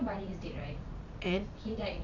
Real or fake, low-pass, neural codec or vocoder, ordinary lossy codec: fake; 7.2 kHz; vocoder, 44.1 kHz, 80 mel bands, Vocos; none